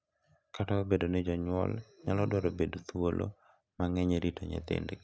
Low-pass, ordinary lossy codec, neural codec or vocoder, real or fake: none; none; none; real